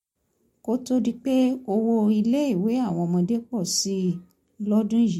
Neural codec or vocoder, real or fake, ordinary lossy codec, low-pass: none; real; MP3, 64 kbps; 19.8 kHz